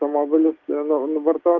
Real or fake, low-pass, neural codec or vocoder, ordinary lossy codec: real; 7.2 kHz; none; Opus, 32 kbps